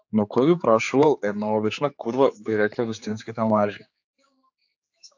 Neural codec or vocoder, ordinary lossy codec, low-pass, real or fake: codec, 16 kHz, 4 kbps, X-Codec, HuBERT features, trained on general audio; AAC, 48 kbps; 7.2 kHz; fake